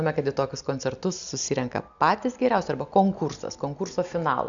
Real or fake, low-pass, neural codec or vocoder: real; 7.2 kHz; none